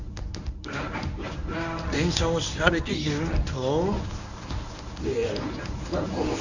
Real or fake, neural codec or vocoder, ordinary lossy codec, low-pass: fake; codec, 24 kHz, 0.9 kbps, WavTokenizer, medium speech release version 1; none; 7.2 kHz